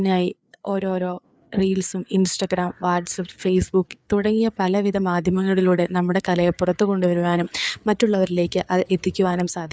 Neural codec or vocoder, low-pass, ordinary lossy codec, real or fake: codec, 16 kHz, 4 kbps, FreqCodec, larger model; none; none; fake